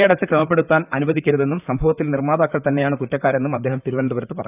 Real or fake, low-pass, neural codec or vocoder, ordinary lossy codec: fake; 3.6 kHz; vocoder, 44.1 kHz, 128 mel bands, Pupu-Vocoder; none